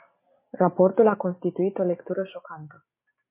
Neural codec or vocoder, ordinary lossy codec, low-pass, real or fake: none; MP3, 24 kbps; 3.6 kHz; real